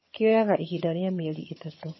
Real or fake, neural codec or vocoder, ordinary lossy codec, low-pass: fake; vocoder, 22.05 kHz, 80 mel bands, HiFi-GAN; MP3, 24 kbps; 7.2 kHz